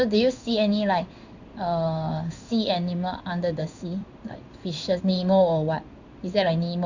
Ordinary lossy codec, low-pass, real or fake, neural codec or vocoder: Opus, 64 kbps; 7.2 kHz; fake; codec, 16 kHz in and 24 kHz out, 1 kbps, XY-Tokenizer